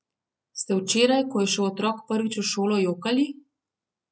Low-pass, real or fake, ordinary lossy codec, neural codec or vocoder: none; real; none; none